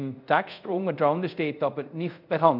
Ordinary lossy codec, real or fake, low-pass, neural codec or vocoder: none; fake; 5.4 kHz; codec, 24 kHz, 0.5 kbps, DualCodec